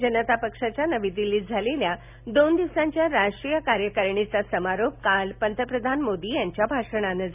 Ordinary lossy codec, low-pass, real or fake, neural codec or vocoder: none; 3.6 kHz; real; none